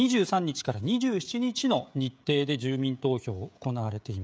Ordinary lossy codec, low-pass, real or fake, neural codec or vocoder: none; none; fake; codec, 16 kHz, 16 kbps, FreqCodec, smaller model